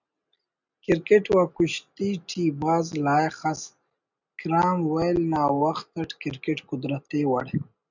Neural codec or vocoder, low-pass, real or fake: none; 7.2 kHz; real